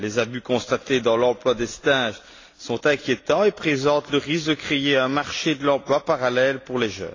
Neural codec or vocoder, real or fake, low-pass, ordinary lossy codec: none; real; 7.2 kHz; AAC, 32 kbps